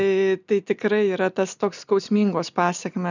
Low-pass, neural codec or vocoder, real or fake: 7.2 kHz; none; real